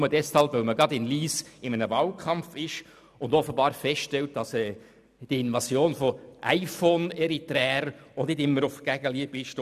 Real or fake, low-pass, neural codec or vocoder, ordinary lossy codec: real; 14.4 kHz; none; none